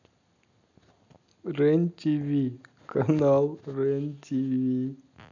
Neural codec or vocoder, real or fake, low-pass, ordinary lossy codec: none; real; 7.2 kHz; none